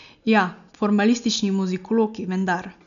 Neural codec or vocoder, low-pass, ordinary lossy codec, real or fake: none; 7.2 kHz; none; real